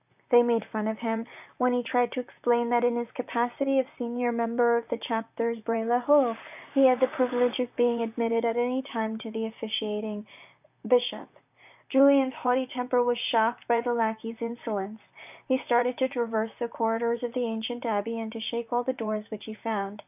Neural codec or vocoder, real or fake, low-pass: vocoder, 44.1 kHz, 128 mel bands, Pupu-Vocoder; fake; 3.6 kHz